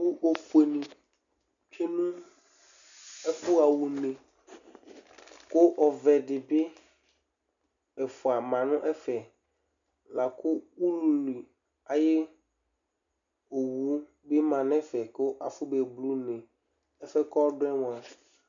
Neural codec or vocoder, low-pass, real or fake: none; 7.2 kHz; real